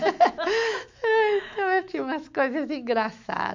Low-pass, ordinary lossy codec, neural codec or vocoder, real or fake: 7.2 kHz; MP3, 64 kbps; autoencoder, 48 kHz, 128 numbers a frame, DAC-VAE, trained on Japanese speech; fake